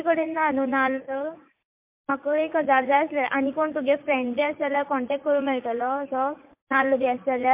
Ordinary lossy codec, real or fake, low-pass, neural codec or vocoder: MP3, 32 kbps; fake; 3.6 kHz; vocoder, 44.1 kHz, 80 mel bands, Vocos